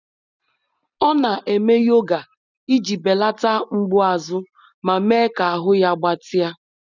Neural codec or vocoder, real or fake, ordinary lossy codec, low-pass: none; real; none; 7.2 kHz